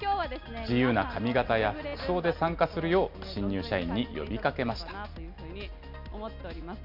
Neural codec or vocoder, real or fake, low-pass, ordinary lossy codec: none; real; 5.4 kHz; none